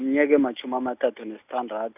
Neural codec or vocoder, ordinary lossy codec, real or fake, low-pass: none; none; real; 3.6 kHz